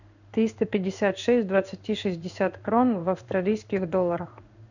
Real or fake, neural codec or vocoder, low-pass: fake; codec, 16 kHz in and 24 kHz out, 1 kbps, XY-Tokenizer; 7.2 kHz